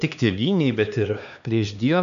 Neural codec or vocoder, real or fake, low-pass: codec, 16 kHz, 2 kbps, X-Codec, HuBERT features, trained on LibriSpeech; fake; 7.2 kHz